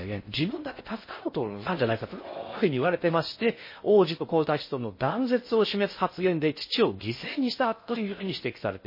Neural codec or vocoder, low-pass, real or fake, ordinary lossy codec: codec, 16 kHz in and 24 kHz out, 0.6 kbps, FocalCodec, streaming, 4096 codes; 5.4 kHz; fake; MP3, 24 kbps